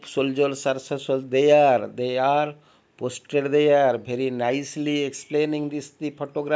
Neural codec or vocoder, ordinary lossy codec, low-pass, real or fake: none; none; none; real